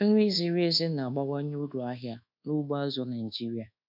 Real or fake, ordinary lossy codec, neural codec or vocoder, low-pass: fake; none; codec, 24 kHz, 1.2 kbps, DualCodec; 5.4 kHz